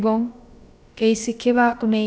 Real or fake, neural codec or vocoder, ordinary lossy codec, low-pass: fake; codec, 16 kHz, about 1 kbps, DyCAST, with the encoder's durations; none; none